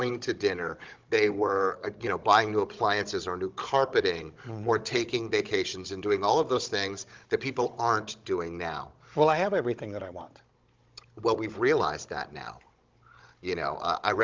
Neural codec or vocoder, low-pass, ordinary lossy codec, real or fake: codec, 16 kHz, 16 kbps, FunCodec, trained on Chinese and English, 50 frames a second; 7.2 kHz; Opus, 32 kbps; fake